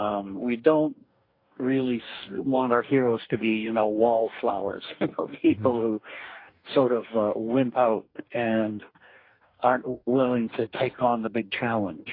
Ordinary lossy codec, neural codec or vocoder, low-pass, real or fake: AAC, 32 kbps; codec, 44.1 kHz, 2.6 kbps, DAC; 5.4 kHz; fake